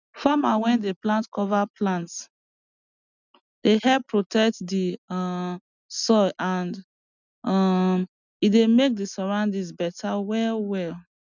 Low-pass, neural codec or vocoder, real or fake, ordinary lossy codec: 7.2 kHz; none; real; none